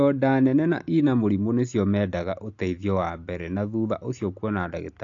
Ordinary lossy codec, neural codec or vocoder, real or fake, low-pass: AAC, 48 kbps; none; real; 7.2 kHz